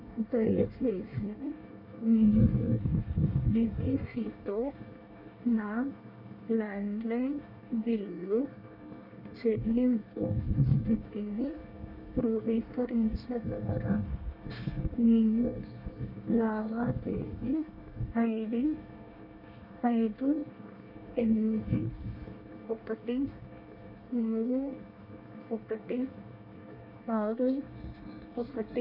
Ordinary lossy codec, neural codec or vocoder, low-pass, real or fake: none; codec, 24 kHz, 1 kbps, SNAC; 5.4 kHz; fake